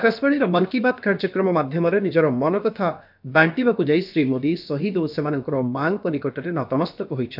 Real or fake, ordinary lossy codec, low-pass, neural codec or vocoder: fake; none; 5.4 kHz; codec, 16 kHz, about 1 kbps, DyCAST, with the encoder's durations